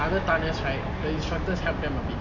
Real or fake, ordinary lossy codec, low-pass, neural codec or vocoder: real; none; 7.2 kHz; none